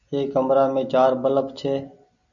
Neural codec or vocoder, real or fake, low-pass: none; real; 7.2 kHz